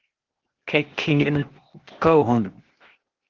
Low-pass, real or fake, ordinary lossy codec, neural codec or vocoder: 7.2 kHz; fake; Opus, 16 kbps; codec, 16 kHz, 0.8 kbps, ZipCodec